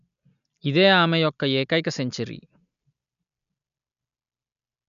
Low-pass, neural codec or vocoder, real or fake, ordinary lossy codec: 7.2 kHz; none; real; none